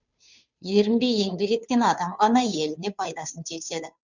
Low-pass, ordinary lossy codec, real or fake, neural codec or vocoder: 7.2 kHz; none; fake; codec, 16 kHz, 2 kbps, FunCodec, trained on Chinese and English, 25 frames a second